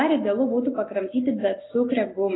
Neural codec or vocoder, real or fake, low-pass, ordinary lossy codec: none; real; 7.2 kHz; AAC, 16 kbps